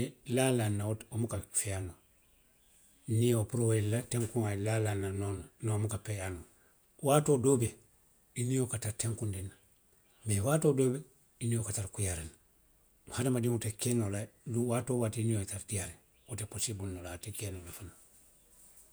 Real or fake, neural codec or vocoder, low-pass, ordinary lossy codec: fake; vocoder, 48 kHz, 128 mel bands, Vocos; none; none